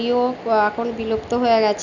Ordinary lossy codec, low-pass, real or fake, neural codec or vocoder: none; 7.2 kHz; real; none